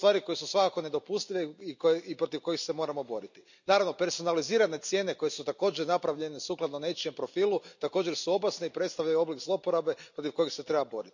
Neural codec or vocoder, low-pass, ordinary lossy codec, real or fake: none; 7.2 kHz; none; real